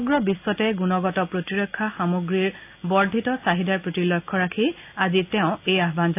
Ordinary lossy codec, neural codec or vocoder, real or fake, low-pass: AAC, 32 kbps; none; real; 3.6 kHz